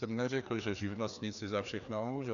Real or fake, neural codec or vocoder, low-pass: fake; codec, 16 kHz, 2 kbps, FreqCodec, larger model; 7.2 kHz